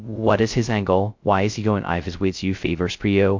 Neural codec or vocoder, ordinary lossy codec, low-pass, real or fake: codec, 16 kHz, 0.2 kbps, FocalCodec; MP3, 48 kbps; 7.2 kHz; fake